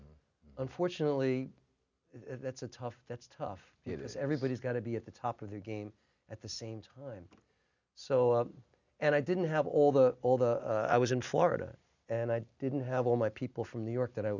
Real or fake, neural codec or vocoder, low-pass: real; none; 7.2 kHz